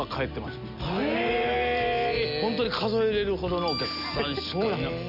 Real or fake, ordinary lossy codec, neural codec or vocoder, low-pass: real; none; none; 5.4 kHz